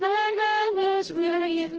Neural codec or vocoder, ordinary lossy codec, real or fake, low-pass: codec, 16 kHz, 0.5 kbps, FreqCodec, larger model; Opus, 16 kbps; fake; 7.2 kHz